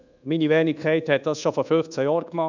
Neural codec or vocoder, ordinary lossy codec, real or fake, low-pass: codec, 24 kHz, 1.2 kbps, DualCodec; none; fake; 7.2 kHz